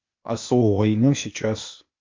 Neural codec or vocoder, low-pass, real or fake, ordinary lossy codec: codec, 16 kHz, 0.8 kbps, ZipCodec; 7.2 kHz; fake; MP3, 48 kbps